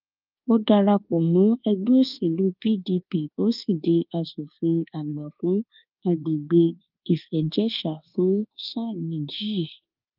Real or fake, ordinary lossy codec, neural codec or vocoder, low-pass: fake; Opus, 24 kbps; codec, 24 kHz, 1.2 kbps, DualCodec; 5.4 kHz